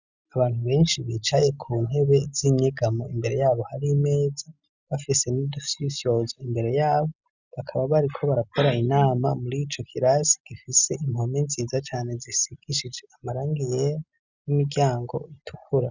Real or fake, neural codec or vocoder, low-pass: real; none; 7.2 kHz